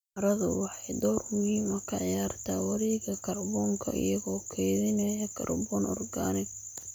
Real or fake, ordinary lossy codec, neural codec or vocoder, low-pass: real; none; none; 19.8 kHz